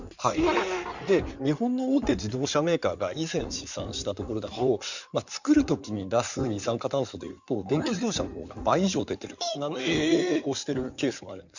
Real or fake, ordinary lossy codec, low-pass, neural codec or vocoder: fake; none; 7.2 kHz; codec, 16 kHz in and 24 kHz out, 2.2 kbps, FireRedTTS-2 codec